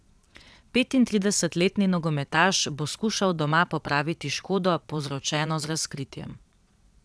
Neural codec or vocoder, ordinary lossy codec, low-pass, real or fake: vocoder, 22.05 kHz, 80 mel bands, Vocos; none; none; fake